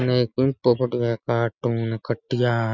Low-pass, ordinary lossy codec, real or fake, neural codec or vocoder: 7.2 kHz; none; real; none